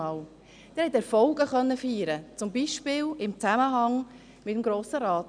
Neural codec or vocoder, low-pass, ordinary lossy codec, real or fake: none; 9.9 kHz; none; real